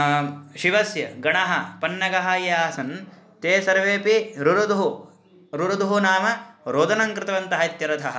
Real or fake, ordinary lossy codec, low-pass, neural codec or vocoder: real; none; none; none